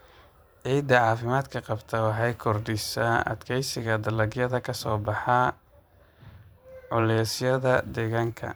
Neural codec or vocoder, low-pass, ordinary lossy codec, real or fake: vocoder, 44.1 kHz, 128 mel bands every 512 samples, BigVGAN v2; none; none; fake